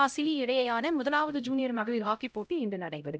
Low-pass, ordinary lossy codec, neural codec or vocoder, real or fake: none; none; codec, 16 kHz, 0.5 kbps, X-Codec, HuBERT features, trained on LibriSpeech; fake